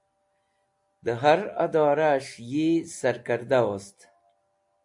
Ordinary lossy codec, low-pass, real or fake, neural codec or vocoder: AAC, 64 kbps; 10.8 kHz; real; none